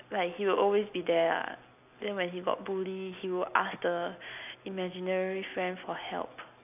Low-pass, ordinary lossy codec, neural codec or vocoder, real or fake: 3.6 kHz; none; none; real